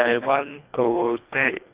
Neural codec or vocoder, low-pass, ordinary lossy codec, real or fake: codec, 24 kHz, 1.5 kbps, HILCodec; 3.6 kHz; Opus, 64 kbps; fake